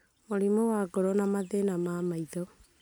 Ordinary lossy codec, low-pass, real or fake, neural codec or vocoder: none; none; real; none